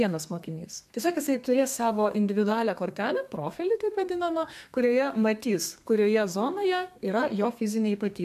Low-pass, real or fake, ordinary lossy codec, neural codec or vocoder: 14.4 kHz; fake; MP3, 96 kbps; codec, 32 kHz, 1.9 kbps, SNAC